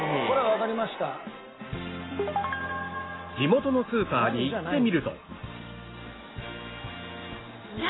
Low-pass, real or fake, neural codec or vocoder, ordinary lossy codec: 7.2 kHz; real; none; AAC, 16 kbps